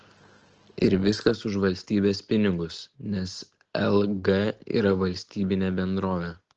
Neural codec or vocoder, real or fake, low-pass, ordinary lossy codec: none; real; 7.2 kHz; Opus, 16 kbps